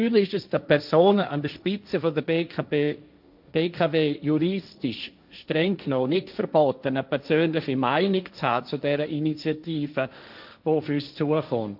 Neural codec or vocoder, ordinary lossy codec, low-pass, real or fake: codec, 16 kHz, 1.1 kbps, Voila-Tokenizer; none; 5.4 kHz; fake